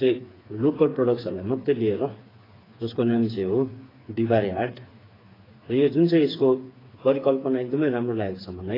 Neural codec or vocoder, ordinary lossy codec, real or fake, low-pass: codec, 16 kHz, 4 kbps, FreqCodec, smaller model; AAC, 24 kbps; fake; 5.4 kHz